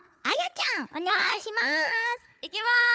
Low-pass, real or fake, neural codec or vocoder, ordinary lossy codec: none; fake; codec, 16 kHz, 4 kbps, FunCodec, trained on Chinese and English, 50 frames a second; none